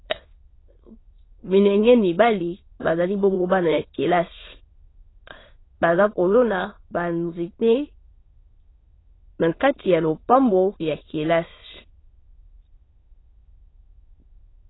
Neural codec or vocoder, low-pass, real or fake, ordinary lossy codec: autoencoder, 22.05 kHz, a latent of 192 numbers a frame, VITS, trained on many speakers; 7.2 kHz; fake; AAC, 16 kbps